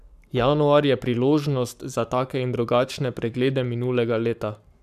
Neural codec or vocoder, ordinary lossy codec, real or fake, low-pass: codec, 44.1 kHz, 7.8 kbps, Pupu-Codec; none; fake; 14.4 kHz